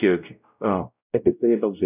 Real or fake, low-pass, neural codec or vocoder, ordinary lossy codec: fake; 3.6 kHz; codec, 16 kHz, 0.5 kbps, X-Codec, WavLM features, trained on Multilingual LibriSpeech; none